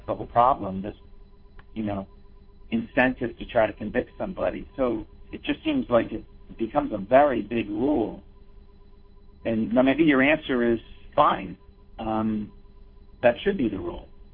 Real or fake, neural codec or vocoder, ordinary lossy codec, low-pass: fake; codec, 16 kHz, 2 kbps, FunCodec, trained on Chinese and English, 25 frames a second; MP3, 32 kbps; 5.4 kHz